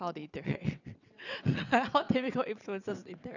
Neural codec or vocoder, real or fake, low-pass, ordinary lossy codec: vocoder, 22.05 kHz, 80 mel bands, WaveNeXt; fake; 7.2 kHz; none